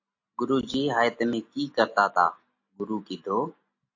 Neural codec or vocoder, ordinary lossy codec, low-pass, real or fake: none; AAC, 48 kbps; 7.2 kHz; real